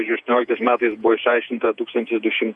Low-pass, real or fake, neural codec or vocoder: 10.8 kHz; fake; vocoder, 48 kHz, 128 mel bands, Vocos